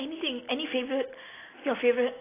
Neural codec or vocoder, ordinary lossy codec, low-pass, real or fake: none; AAC, 16 kbps; 3.6 kHz; real